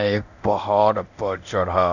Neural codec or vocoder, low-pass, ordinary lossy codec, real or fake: codec, 24 kHz, 0.9 kbps, DualCodec; 7.2 kHz; none; fake